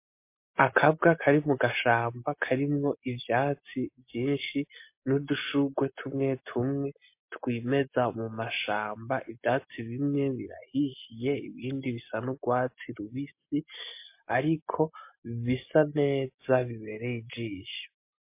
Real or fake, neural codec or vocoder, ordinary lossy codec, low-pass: real; none; MP3, 24 kbps; 3.6 kHz